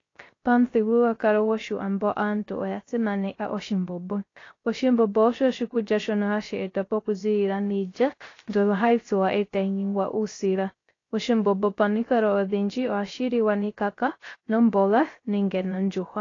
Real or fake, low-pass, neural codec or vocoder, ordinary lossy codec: fake; 7.2 kHz; codec, 16 kHz, 0.3 kbps, FocalCodec; AAC, 32 kbps